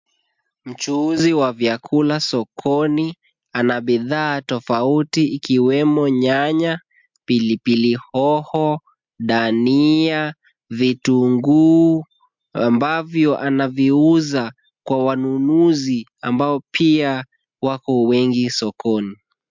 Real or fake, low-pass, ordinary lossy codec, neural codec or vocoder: real; 7.2 kHz; MP3, 64 kbps; none